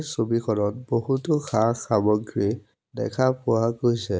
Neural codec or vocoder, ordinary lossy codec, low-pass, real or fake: none; none; none; real